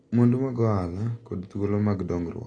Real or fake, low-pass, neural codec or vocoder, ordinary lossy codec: real; 9.9 kHz; none; AAC, 32 kbps